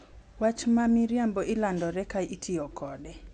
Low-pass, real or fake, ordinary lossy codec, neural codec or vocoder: 10.8 kHz; real; none; none